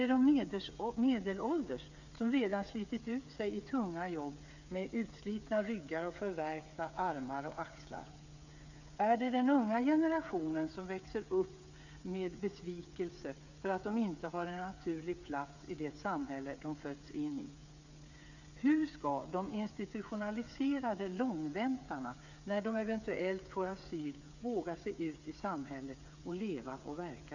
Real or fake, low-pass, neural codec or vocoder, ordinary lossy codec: fake; 7.2 kHz; codec, 16 kHz, 8 kbps, FreqCodec, smaller model; none